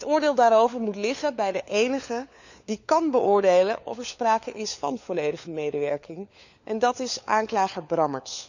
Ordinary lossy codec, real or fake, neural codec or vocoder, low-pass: none; fake; codec, 16 kHz, 4 kbps, FunCodec, trained on LibriTTS, 50 frames a second; 7.2 kHz